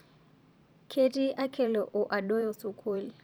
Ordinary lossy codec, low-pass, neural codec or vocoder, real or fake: none; none; vocoder, 44.1 kHz, 128 mel bands every 512 samples, BigVGAN v2; fake